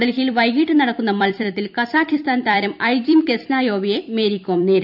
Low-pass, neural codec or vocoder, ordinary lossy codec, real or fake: 5.4 kHz; vocoder, 44.1 kHz, 128 mel bands every 256 samples, BigVGAN v2; none; fake